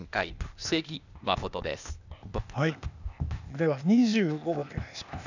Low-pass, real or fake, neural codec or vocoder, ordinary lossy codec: 7.2 kHz; fake; codec, 16 kHz, 0.8 kbps, ZipCodec; none